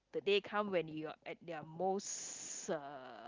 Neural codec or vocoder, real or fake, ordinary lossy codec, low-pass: none; real; Opus, 24 kbps; 7.2 kHz